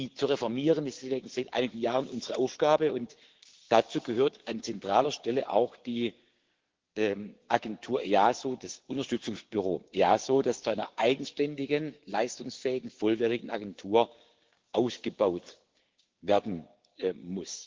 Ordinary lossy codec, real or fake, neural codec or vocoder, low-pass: Opus, 16 kbps; fake; codec, 16 kHz, 6 kbps, DAC; 7.2 kHz